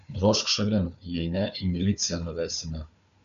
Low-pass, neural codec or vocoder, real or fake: 7.2 kHz; codec, 16 kHz, 4 kbps, FunCodec, trained on Chinese and English, 50 frames a second; fake